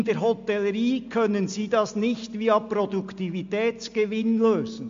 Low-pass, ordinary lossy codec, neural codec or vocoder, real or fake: 7.2 kHz; MP3, 48 kbps; none; real